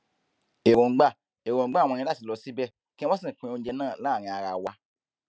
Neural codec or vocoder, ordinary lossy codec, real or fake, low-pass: none; none; real; none